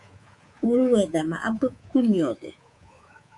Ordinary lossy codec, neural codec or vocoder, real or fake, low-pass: Opus, 64 kbps; codec, 24 kHz, 3.1 kbps, DualCodec; fake; 10.8 kHz